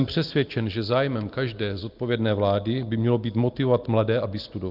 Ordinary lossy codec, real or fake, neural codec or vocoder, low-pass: Opus, 32 kbps; real; none; 5.4 kHz